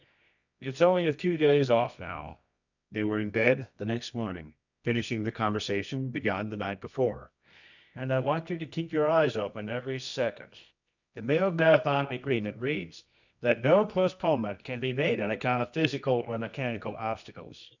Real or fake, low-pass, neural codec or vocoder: fake; 7.2 kHz; codec, 24 kHz, 0.9 kbps, WavTokenizer, medium music audio release